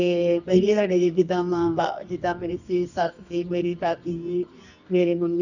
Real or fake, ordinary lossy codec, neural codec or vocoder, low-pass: fake; none; codec, 24 kHz, 0.9 kbps, WavTokenizer, medium music audio release; 7.2 kHz